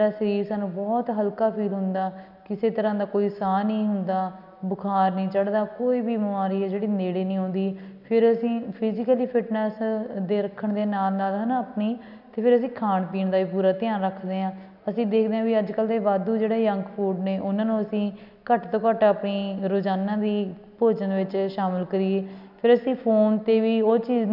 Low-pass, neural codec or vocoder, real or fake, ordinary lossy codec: 5.4 kHz; none; real; none